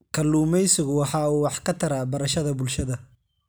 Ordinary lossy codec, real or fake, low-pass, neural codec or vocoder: none; real; none; none